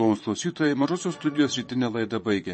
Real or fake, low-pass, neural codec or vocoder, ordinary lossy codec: fake; 9.9 kHz; vocoder, 22.05 kHz, 80 mel bands, Vocos; MP3, 32 kbps